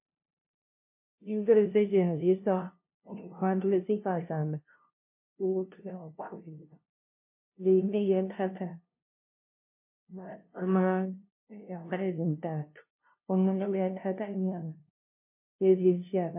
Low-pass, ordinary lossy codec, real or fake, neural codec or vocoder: 3.6 kHz; MP3, 24 kbps; fake; codec, 16 kHz, 0.5 kbps, FunCodec, trained on LibriTTS, 25 frames a second